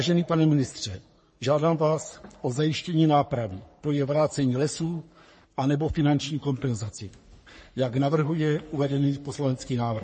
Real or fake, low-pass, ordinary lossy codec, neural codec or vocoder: fake; 10.8 kHz; MP3, 32 kbps; codec, 44.1 kHz, 3.4 kbps, Pupu-Codec